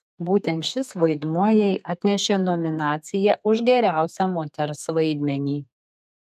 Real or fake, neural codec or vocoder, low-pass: fake; codec, 32 kHz, 1.9 kbps, SNAC; 14.4 kHz